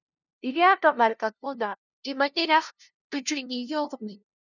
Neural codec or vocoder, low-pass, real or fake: codec, 16 kHz, 0.5 kbps, FunCodec, trained on LibriTTS, 25 frames a second; 7.2 kHz; fake